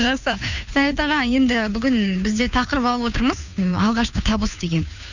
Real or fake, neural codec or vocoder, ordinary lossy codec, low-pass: fake; codec, 16 kHz, 2 kbps, FunCodec, trained on Chinese and English, 25 frames a second; none; 7.2 kHz